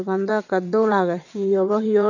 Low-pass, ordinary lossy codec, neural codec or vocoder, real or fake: 7.2 kHz; none; vocoder, 22.05 kHz, 80 mel bands, WaveNeXt; fake